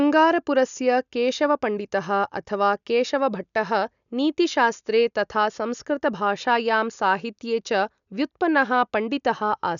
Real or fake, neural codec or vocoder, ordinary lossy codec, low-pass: real; none; none; 7.2 kHz